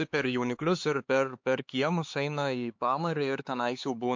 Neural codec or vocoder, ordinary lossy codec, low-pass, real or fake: codec, 16 kHz, 4 kbps, X-Codec, HuBERT features, trained on LibriSpeech; MP3, 48 kbps; 7.2 kHz; fake